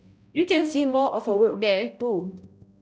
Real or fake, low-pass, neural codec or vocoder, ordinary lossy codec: fake; none; codec, 16 kHz, 0.5 kbps, X-Codec, HuBERT features, trained on balanced general audio; none